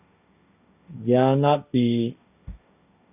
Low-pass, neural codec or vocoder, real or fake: 3.6 kHz; codec, 16 kHz, 1.1 kbps, Voila-Tokenizer; fake